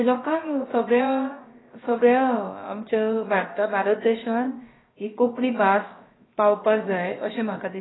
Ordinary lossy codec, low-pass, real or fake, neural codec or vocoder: AAC, 16 kbps; 7.2 kHz; fake; codec, 16 kHz, about 1 kbps, DyCAST, with the encoder's durations